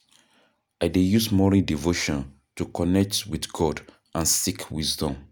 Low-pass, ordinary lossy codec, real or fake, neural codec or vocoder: none; none; real; none